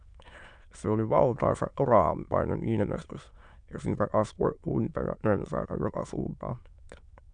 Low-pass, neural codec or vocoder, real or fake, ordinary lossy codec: 9.9 kHz; autoencoder, 22.05 kHz, a latent of 192 numbers a frame, VITS, trained on many speakers; fake; MP3, 96 kbps